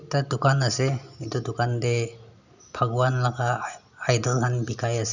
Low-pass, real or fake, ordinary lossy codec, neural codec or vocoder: 7.2 kHz; real; none; none